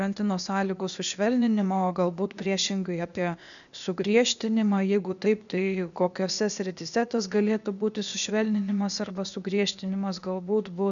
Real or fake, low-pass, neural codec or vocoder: fake; 7.2 kHz; codec, 16 kHz, 0.8 kbps, ZipCodec